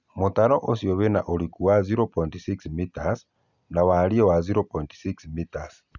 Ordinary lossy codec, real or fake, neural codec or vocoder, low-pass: none; real; none; 7.2 kHz